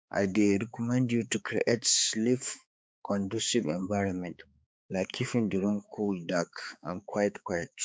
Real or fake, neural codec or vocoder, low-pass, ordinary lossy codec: fake; codec, 16 kHz, 4 kbps, X-Codec, HuBERT features, trained on balanced general audio; none; none